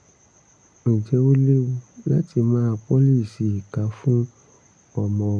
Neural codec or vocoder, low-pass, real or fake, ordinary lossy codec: none; 9.9 kHz; real; MP3, 64 kbps